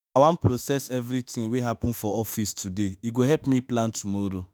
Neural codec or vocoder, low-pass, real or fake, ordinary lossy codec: autoencoder, 48 kHz, 32 numbers a frame, DAC-VAE, trained on Japanese speech; none; fake; none